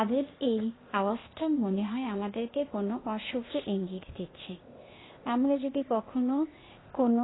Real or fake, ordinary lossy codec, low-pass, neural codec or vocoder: fake; AAC, 16 kbps; 7.2 kHz; codec, 16 kHz, 0.8 kbps, ZipCodec